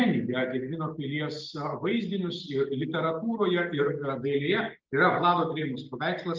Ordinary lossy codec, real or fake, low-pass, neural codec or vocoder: Opus, 32 kbps; real; 7.2 kHz; none